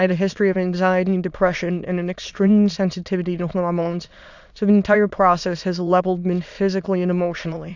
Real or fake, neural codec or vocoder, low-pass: fake; autoencoder, 22.05 kHz, a latent of 192 numbers a frame, VITS, trained on many speakers; 7.2 kHz